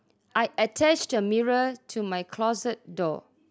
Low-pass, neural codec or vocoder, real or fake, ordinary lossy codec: none; none; real; none